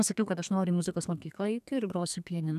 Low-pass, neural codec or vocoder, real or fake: 14.4 kHz; codec, 32 kHz, 1.9 kbps, SNAC; fake